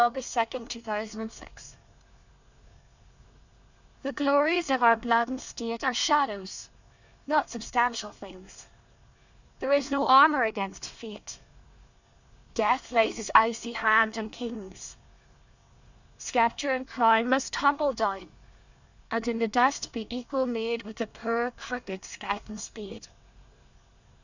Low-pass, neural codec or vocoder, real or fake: 7.2 kHz; codec, 24 kHz, 1 kbps, SNAC; fake